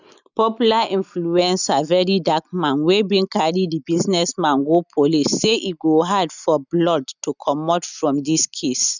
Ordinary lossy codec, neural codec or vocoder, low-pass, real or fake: none; none; 7.2 kHz; real